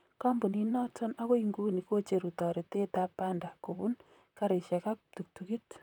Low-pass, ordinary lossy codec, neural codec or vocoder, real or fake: 19.8 kHz; none; vocoder, 44.1 kHz, 128 mel bands, Pupu-Vocoder; fake